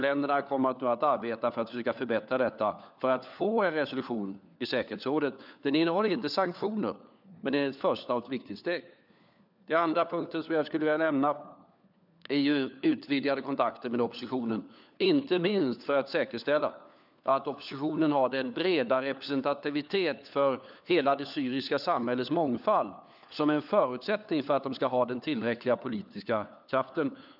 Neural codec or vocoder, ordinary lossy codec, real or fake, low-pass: codec, 16 kHz, 4 kbps, FunCodec, trained on LibriTTS, 50 frames a second; none; fake; 5.4 kHz